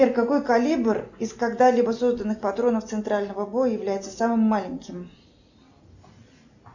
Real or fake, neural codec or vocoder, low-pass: real; none; 7.2 kHz